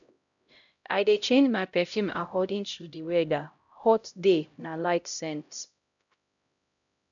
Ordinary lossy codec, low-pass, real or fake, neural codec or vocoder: none; 7.2 kHz; fake; codec, 16 kHz, 0.5 kbps, X-Codec, HuBERT features, trained on LibriSpeech